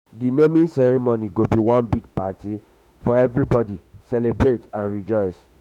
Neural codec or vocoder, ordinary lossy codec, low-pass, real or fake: autoencoder, 48 kHz, 32 numbers a frame, DAC-VAE, trained on Japanese speech; none; 19.8 kHz; fake